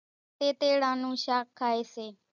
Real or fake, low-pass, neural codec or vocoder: real; 7.2 kHz; none